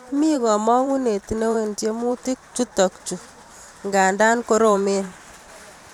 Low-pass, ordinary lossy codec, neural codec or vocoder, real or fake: 19.8 kHz; none; vocoder, 44.1 kHz, 128 mel bands every 512 samples, BigVGAN v2; fake